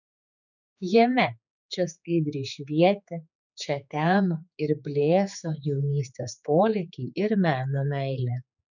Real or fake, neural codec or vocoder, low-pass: fake; codec, 16 kHz, 4 kbps, X-Codec, HuBERT features, trained on general audio; 7.2 kHz